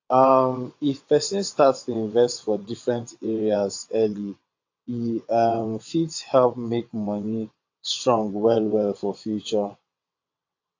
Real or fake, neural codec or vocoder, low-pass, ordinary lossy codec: fake; vocoder, 22.05 kHz, 80 mel bands, WaveNeXt; 7.2 kHz; AAC, 48 kbps